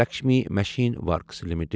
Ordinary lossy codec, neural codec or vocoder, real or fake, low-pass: none; none; real; none